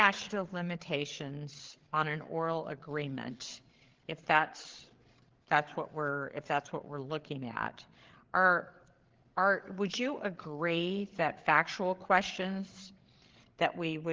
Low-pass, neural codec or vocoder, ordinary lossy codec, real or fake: 7.2 kHz; codec, 16 kHz, 8 kbps, FreqCodec, larger model; Opus, 16 kbps; fake